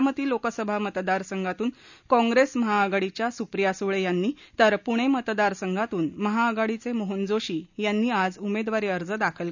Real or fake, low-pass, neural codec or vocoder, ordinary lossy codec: real; 7.2 kHz; none; none